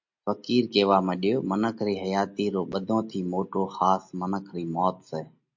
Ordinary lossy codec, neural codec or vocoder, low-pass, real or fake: MP3, 48 kbps; none; 7.2 kHz; real